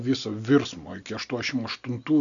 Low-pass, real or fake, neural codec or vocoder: 7.2 kHz; real; none